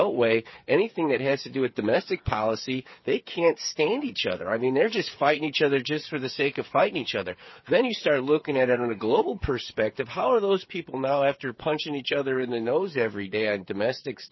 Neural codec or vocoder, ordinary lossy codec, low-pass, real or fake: codec, 16 kHz, 8 kbps, FreqCodec, smaller model; MP3, 24 kbps; 7.2 kHz; fake